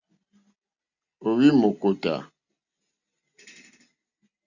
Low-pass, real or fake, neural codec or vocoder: 7.2 kHz; real; none